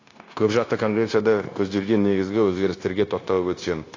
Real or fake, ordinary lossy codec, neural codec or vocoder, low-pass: fake; AAC, 32 kbps; codec, 16 kHz, 0.9 kbps, LongCat-Audio-Codec; 7.2 kHz